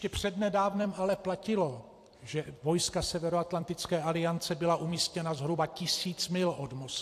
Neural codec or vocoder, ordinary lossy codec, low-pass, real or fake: vocoder, 44.1 kHz, 128 mel bands every 256 samples, BigVGAN v2; AAC, 64 kbps; 14.4 kHz; fake